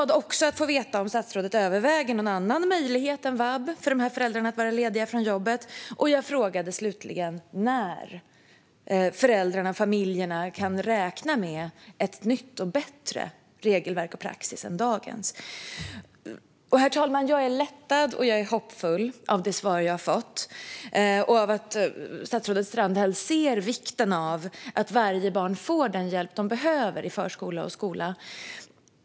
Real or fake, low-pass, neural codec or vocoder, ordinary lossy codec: real; none; none; none